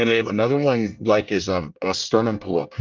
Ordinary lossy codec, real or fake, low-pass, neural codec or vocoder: Opus, 24 kbps; fake; 7.2 kHz; codec, 24 kHz, 1 kbps, SNAC